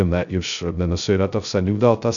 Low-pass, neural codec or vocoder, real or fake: 7.2 kHz; codec, 16 kHz, 0.2 kbps, FocalCodec; fake